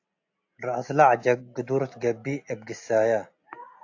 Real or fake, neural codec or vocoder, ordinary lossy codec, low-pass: real; none; AAC, 48 kbps; 7.2 kHz